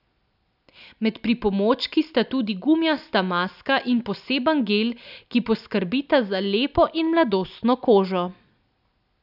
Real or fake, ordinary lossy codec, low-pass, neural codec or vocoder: real; none; 5.4 kHz; none